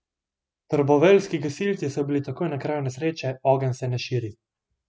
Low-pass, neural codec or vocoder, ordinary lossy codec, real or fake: none; none; none; real